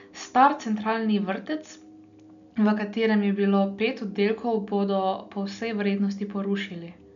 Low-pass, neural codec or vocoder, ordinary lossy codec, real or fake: 7.2 kHz; none; none; real